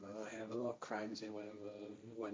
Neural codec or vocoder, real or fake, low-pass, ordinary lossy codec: codec, 16 kHz, 1.1 kbps, Voila-Tokenizer; fake; none; none